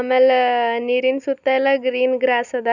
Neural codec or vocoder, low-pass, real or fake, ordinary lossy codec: none; 7.2 kHz; real; none